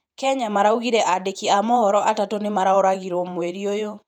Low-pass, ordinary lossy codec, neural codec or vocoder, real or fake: 19.8 kHz; none; vocoder, 48 kHz, 128 mel bands, Vocos; fake